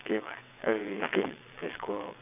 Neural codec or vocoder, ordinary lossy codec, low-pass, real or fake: vocoder, 22.05 kHz, 80 mel bands, WaveNeXt; none; 3.6 kHz; fake